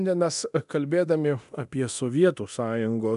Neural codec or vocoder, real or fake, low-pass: codec, 24 kHz, 0.9 kbps, DualCodec; fake; 10.8 kHz